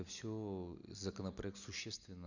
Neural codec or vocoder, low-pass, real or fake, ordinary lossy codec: none; 7.2 kHz; real; none